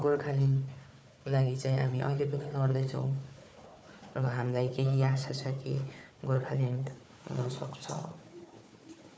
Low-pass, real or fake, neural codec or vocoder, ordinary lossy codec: none; fake; codec, 16 kHz, 4 kbps, FunCodec, trained on Chinese and English, 50 frames a second; none